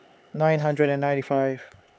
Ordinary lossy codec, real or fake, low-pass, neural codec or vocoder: none; fake; none; codec, 16 kHz, 4 kbps, X-Codec, HuBERT features, trained on LibriSpeech